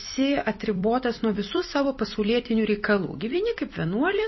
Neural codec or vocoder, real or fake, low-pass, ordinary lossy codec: vocoder, 44.1 kHz, 128 mel bands every 256 samples, BigVGAN v2; fake; 7.2 kHz; MP3, 24 kbps